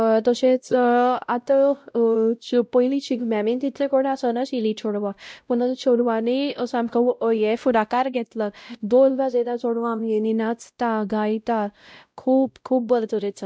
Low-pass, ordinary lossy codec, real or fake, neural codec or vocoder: none; none; fake; codec, 16 kHz, 0.5 kbps, X-Codec, WavLM features, trained on Multilingual LibriSpeech